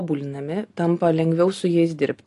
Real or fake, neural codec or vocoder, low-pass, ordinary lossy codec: real; none; 10.8 kHz; AAC, 48 kbps